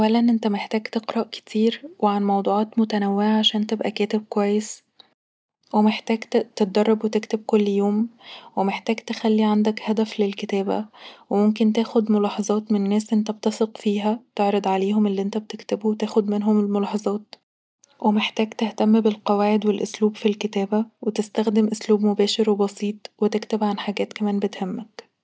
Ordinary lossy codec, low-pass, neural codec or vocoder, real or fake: none; none; none; real